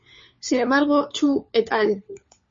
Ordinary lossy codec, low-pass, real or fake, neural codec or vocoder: MP3, 32 kbps; 7.2 kHz; fake; codec, 16 kHz, 4 kbps, FunCodec, trained on LibriTTS, 50 frames a second